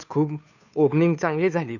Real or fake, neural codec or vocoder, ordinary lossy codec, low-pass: fake; codec, 16 kHz, 2 kbps, FunCodec, trained on Chinese and English, 25 frames a second; none; 7.2 kHz